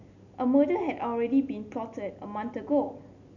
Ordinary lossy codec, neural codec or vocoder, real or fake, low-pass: none; none; real; 7.2 kHz